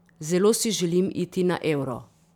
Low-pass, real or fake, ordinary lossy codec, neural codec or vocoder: 19.8 kHz; fake; none; vocoder, 44.1 kHz, 128 mel bands every 512 samples, BigVGAN v2